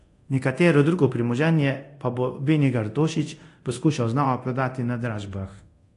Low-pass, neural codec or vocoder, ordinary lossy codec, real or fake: 10.8 kHz; codec, 24 kHz, 0.9 kbps, DualCodec; AAC, 48 kbps; fake